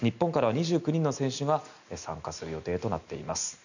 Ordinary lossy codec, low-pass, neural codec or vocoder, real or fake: none; 7.2 kHz; none; real